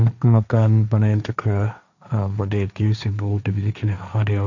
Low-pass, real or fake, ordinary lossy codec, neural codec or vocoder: 7.2 kHz; fake; none; codec, 16 kHz, 1.1 kbps, Voila-Tokenizer